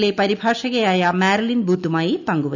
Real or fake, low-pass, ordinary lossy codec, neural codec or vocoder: real; 7.2 kHz; none; none